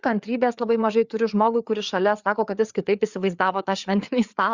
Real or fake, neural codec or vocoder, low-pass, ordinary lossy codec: fake; codec, 16 kHz, 4 kbps, FreqCodec, larger model; 7.2 kHz; Opus, 64 kbps